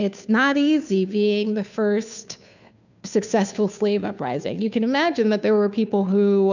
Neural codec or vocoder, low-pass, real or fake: codec, 16 kHz, 2 kbps, FunCodec, trained on Chinese and English, 25 frames a second; 7.2 kHz; fake